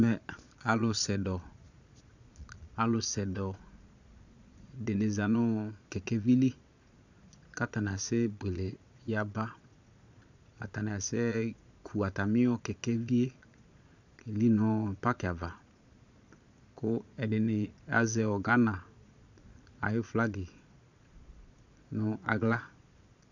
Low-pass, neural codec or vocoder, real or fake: 7.2 kHz; vocoder, 22.05 kHz, 80 mel bands, Vocos; fake